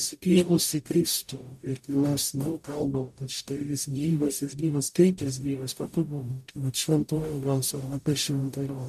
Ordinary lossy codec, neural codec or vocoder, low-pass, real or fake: MP3, 96 kbps; codec, 44.1 kHz, 0.9 kbps, DAC; 14.4 kHz; fake